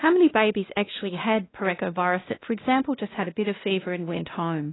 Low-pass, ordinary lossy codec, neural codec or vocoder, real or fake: 7.2 kHz; AAC, 16 kbps; codec, 16 kHz, 1 kbps, X-Codec, WavLM features, trained on Multilingual LibriSpeech; fake